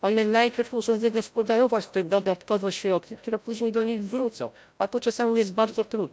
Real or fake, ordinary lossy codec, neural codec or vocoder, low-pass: fake; none; codec, 16 kHz, 0.5 kbps, FreqCodec, larger model; none